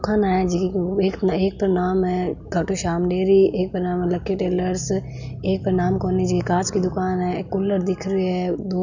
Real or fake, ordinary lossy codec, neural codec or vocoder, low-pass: real; none; none; 7.2 kHz